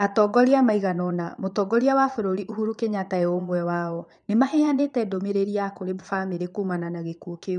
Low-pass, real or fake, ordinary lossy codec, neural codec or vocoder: 9.9 kHz; fake; none; vocoder, 22.05 kHz, 80 mel bands, Vocos